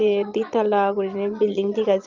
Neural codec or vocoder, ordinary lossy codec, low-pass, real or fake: vocoder, 22.05 kHz, 80 mel bands, Vocos; Opus, 32 kbps; 7.2 kHz; fake